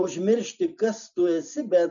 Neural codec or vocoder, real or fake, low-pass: none; real; 7.2 kHz